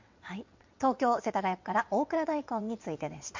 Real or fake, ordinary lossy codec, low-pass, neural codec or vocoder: fake; MP3, 48 kbps; 7.2 kHz; vocoder, 22.05 kHz, 80 mel bands, WaveNeXt